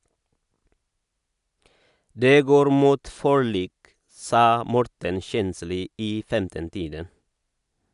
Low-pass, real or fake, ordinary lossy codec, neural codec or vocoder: 10.8 kHz; real; none; none